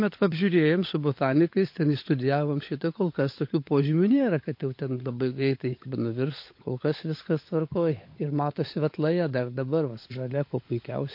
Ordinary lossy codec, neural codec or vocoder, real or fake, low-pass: MP3, 32 kbps; codec, 16 kHz, 16 kbps, FunCodec, trained on LibriTTS, 50 frames a second; fake; 5.4 kHz